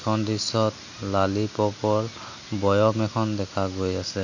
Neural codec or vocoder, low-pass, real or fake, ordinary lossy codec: none; 7.2 kHz; real; none